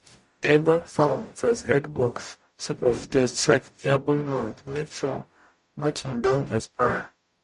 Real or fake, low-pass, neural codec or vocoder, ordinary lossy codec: fake; 14.4 kHz; codec, 44.1 kHz, 0.9 kbps, DAC; MP3, 48 kbps